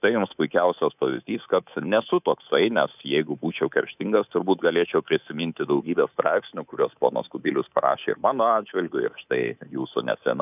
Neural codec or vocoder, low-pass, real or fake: codec, 24 kHz, 3.1 kbps, DualCodec; 3.6 kHz; fake